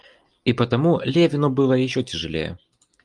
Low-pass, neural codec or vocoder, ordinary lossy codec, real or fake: 10.8 kHz; none; Opus, 32 kbps; real